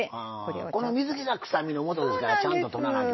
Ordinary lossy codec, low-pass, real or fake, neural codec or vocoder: MP3, 24 kbps; 7.2 kHz; real; none